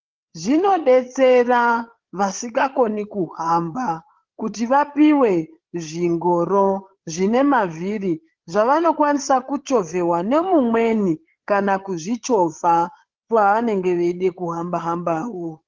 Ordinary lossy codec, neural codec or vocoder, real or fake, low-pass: Opus, 16 kbps; codec, 16 kHz, 8 kbps, FreqCodec, larger model; fake; 7.2 kHz